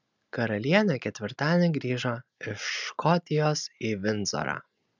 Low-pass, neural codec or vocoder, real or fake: 7.2 kHz; none; real